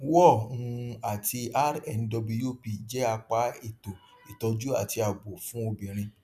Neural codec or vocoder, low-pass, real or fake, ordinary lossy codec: none; 14.4 kHz; real; none